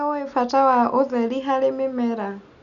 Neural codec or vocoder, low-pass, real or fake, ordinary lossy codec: none; 7.2 kHz; real; none